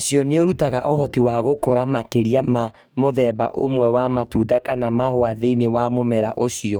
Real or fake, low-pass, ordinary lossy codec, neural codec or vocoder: fake; none; none; codec, 44.1 kHz, 2.6 kbps, DAC